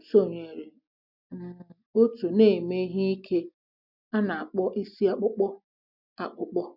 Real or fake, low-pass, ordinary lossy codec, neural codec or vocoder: real; 5.4 kHz; none; none